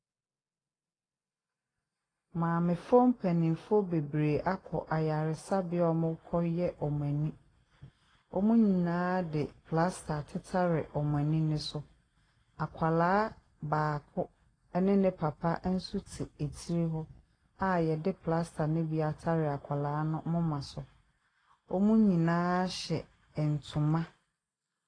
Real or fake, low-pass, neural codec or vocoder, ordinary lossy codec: real; 9.9 kHz; none; AAC, 32 kbps